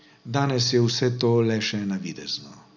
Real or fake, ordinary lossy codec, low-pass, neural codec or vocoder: real; none; 7.2 kHz; none